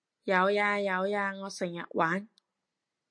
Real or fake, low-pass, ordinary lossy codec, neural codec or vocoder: real; 9.9 kHz; MP3, 48 kbps; none